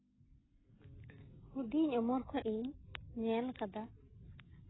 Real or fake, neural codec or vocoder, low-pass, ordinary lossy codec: real; none; 7.2 kHz; AAC, 16 kbps